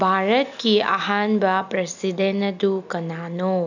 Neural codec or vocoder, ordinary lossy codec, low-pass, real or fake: none; none; 7.2 kHz; real